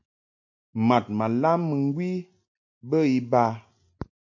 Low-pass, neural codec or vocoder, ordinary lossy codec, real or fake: 7.2 kHz; none; MP3, 64 kbps; real